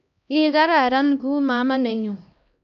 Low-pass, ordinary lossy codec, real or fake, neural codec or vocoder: 7.2 kHz; none; fake; codec, 16 kHz, 1 kbps, X-Codec, HuBERT features, trained on LibriSpeech